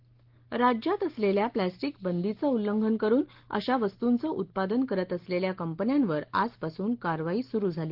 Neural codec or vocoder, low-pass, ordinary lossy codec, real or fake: none; 5.4 kHz; Opus, 16 kbps; real